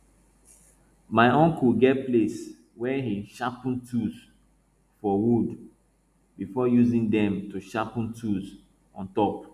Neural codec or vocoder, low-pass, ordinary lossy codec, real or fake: none; 14.4 kHz; none; real